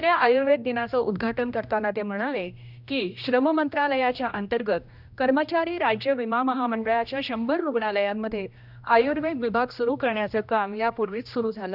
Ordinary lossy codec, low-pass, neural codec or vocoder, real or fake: none; 5.4 kHz; codec, 16 kHz, 1 kbps, X-Codec, HuBERT features, trained on general audio; fake